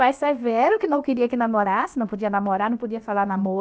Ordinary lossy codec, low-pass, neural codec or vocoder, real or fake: none; none; codec, 16 kHz, about 1 kbps, DyCAST, with the encoder's durations; fake